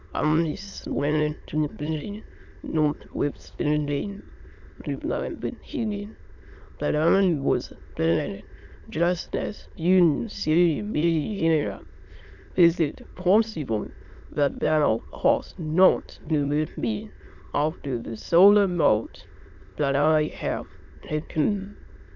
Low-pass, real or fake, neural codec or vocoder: 7.2 kHz; fake; autoencoder, 22.05 kHz, a latent of 192 numbers a frame, VITS, trained on many speakers